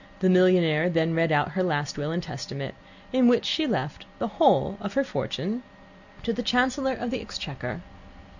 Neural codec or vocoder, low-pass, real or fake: none; 7.2 kHz; real